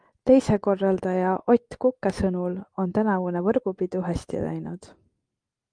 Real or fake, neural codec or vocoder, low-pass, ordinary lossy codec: real; none; 9.9 kHz; Opus, 32 kbps